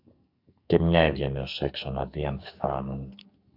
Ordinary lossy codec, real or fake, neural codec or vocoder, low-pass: AAC, 48 kbps; fake; codec, 44.1 kHz, 2.6 kbps, SNAC; 5.4 kHz